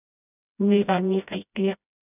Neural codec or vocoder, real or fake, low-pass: codec, 16 kHz, 0.5 kbps, FreqCodec, smaller model; fake; 3.6 kHz